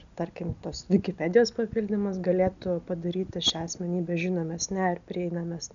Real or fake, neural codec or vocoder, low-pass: real; none; 7.2 kHz